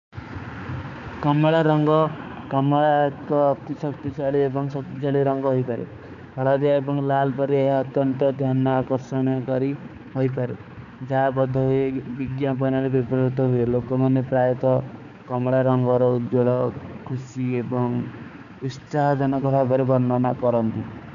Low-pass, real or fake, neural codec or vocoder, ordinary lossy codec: 7.2 kHz; fake; codec, 16 kHz, 4 kbps, X-Codec, HuBERT features, trained on balanced general audio; none